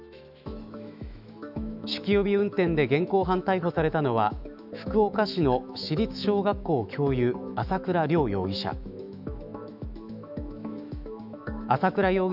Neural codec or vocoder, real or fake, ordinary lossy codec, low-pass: autoencoder, 48 kHz, 128 numbers a frame, DAC-VAE, trained on Japanese speech; fake; none; 5.4 kHz